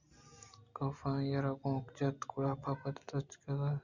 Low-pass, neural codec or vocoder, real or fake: 7.2 kHz; none; real